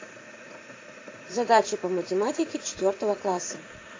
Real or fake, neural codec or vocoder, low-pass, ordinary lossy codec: fake; vocoder, 44.1 kHz, 80 mel bands, Vocos; 7.2 kHz; AAC, 32 kbps